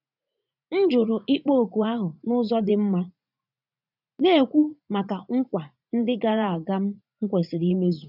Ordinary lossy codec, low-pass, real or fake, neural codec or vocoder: none; 5.4 kHz; fake; vocoder, 44.1 kHz, 80 mel bands, Vocos